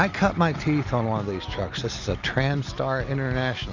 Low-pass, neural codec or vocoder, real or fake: 7.2 kHz; none; real